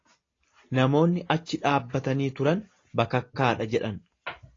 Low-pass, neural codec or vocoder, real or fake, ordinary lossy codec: 7.2 kHz; none; real; AAC, 32 kbps